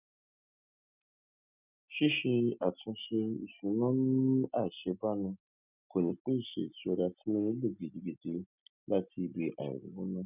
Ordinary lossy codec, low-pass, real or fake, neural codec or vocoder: none; 3.6 kHz; real; none